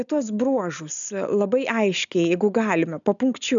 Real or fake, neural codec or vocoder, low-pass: real; none; 7.2 kHz